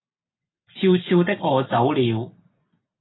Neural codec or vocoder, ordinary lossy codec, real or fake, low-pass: none; AAC, 16 kbps; real; 7.2 kHz